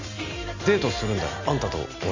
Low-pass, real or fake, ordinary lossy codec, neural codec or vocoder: 7.2 kHz; real; MP3, 48 kbps; none